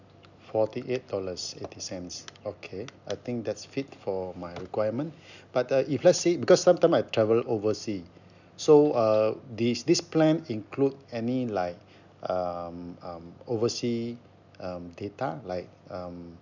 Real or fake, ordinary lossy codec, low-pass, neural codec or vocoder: real; none; 7.2 kHz; none